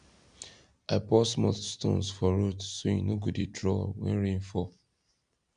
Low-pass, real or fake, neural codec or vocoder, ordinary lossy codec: 9.9 kHz; real; none; none